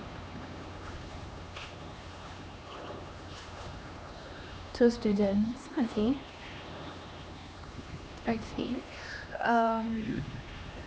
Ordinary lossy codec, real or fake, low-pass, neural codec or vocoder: none; fake; none; codec, 16 kHz, 2 kbps, X-Codec, HuBERT features, trained on LibriSpeech